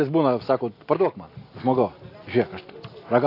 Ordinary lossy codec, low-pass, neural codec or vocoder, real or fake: AAC, 32 kbps; 5.4 kHz; none; real